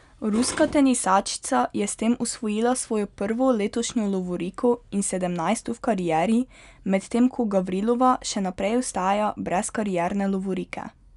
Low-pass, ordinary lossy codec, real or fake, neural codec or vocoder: 10.8 kHz; none; real; none